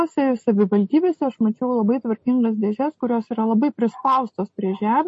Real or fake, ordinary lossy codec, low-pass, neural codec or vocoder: real; MP3, 32 kbps; 10.8 kHz; none